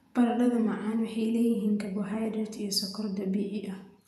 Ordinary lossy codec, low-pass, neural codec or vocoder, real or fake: none; 14.4 kHz; vocoder, 48 kHz, 128 mel bands, Vocos; fake